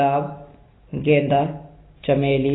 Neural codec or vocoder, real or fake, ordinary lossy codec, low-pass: none; real; AAC, 16 kbps; 7.2 kHz